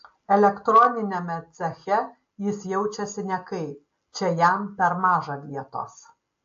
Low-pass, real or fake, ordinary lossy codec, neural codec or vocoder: 7.2 kHz; real; AAC, 48 kbps; none